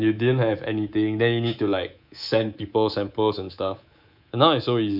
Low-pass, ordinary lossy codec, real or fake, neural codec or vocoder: 5.4 kHz; none; fake; codec, 24 kHz, 3.1 kbps, DualCodec